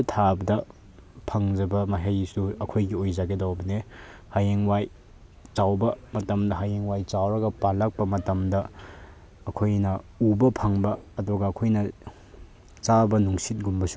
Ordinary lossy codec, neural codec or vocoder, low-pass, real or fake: none; none; none; real